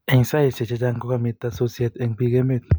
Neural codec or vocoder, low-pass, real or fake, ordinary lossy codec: none; none; real; none